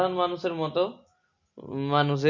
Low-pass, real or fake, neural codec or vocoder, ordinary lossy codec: 7.2 kHz; real; none; none